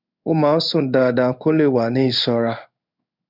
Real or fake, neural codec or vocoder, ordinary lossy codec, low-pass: fake; codec, 16 kHz in and 24 kHz out, 1 kbps, XY-Tokenizer; none; 5.4 kHz